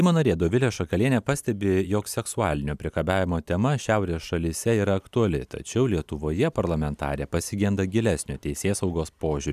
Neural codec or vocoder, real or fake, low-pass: none; real; 14.4 kHz